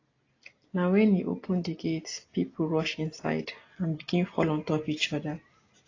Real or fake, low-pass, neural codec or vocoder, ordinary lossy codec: real; 7.2 kHz; none; AAC, 32 kbps